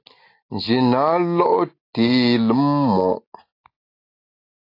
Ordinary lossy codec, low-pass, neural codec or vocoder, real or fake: AAC, 32 kbps; 5.4 kHz; none; real